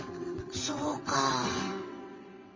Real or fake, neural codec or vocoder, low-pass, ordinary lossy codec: fake; vocoder, 22.05 kHz, 80 mel bands, WaveNeXt; 7.2 kHz; MP3, 32 kbps